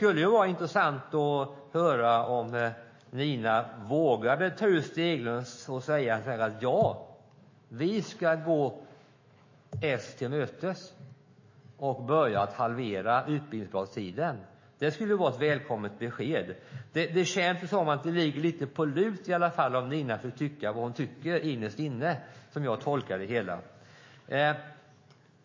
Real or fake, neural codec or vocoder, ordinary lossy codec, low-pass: fake; autoencoder, 48 kHz, 128 numbers a frame, DAC-VAE, trained on Japanese speech; MP3, 32 kbps; 7.2 kHz